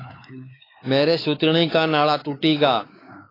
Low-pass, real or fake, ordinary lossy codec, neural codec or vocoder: 5.4 kHz; fake; AAC, 24 kbps; codec, 16 kHz, 4 kbps, X-Codec, WavLM features, trained on Multilingual LibriSpeech